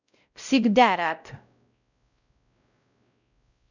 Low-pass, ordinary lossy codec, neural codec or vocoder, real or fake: 7.2 kHz; none; codec, 16 kHz, 0.5 kbps, X-Codec, WavLM features, trained on Multilingual LibriSpeech; fake